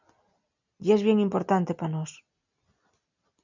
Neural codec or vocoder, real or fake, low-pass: none; real; 7.2 kHz